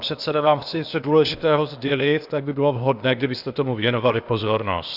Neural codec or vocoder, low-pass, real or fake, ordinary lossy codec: codec, 16 kHz, 0.8 kbps, ZipCodec; 5.4 kHz; fake; Opus, 64 kbps